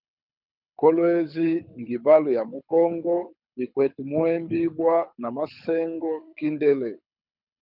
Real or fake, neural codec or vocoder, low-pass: fake; codec, 24 kHz, 6 kbps, HILCodec; 5.4 kHz